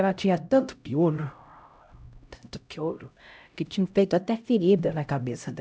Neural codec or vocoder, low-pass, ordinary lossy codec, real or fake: codec, 16 kHz, 0.5 kbps, X-Codec, HuBERT features, trained on LibriSpeech; none; none; fake